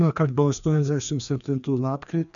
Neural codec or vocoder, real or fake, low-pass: codec, 16 kHz, 2 kbps, FreqCodec, larger model; fake; 7.2 kHz